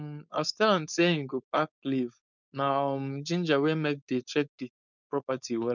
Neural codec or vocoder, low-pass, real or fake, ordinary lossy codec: codec, 16 kHz, 4.8 kbps, FACodec; 7.2 kHz; fake; none